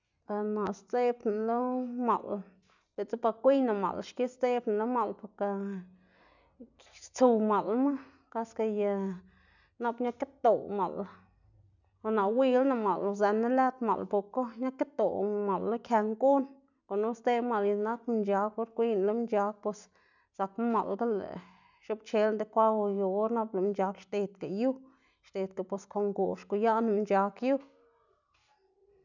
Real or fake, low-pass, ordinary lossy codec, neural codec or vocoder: real; 7.2 kHz; none; none